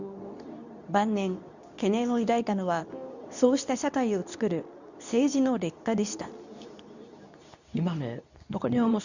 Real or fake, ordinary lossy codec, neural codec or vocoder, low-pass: fake; none; codec, 24 kHz, 0.9 kbps, WavTokenizer, medium speech release version 2; 7.2 kHz